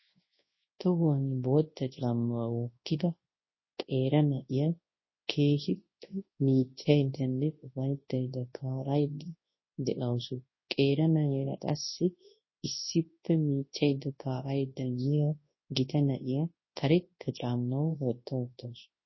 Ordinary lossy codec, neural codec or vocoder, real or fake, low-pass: MP3, 24 kbps; codec, 24 kHz, 0.9 kbps, WavTokenizer, large speech release; fake; 7.2 kHz